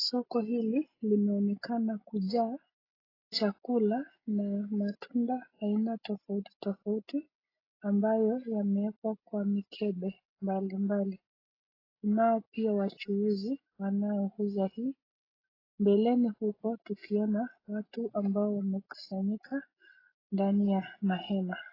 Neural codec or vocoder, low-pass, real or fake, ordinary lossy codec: none; 5.4 kHz; real; AAC, 24 kbps